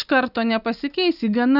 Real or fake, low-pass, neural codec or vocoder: real; 5.4 kHz; none